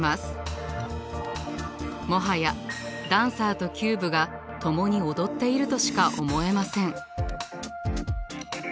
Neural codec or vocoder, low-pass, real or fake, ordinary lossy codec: none; none; real; none